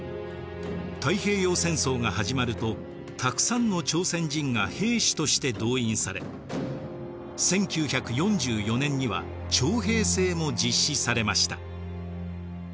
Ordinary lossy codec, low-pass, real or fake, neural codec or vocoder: none; none; real; none